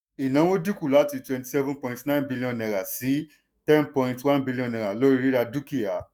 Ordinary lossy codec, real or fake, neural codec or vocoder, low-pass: none; fake; autoencoder, 48 kHz, 128 numbers a frame, DAC-VAE, trained on Japanese speech; none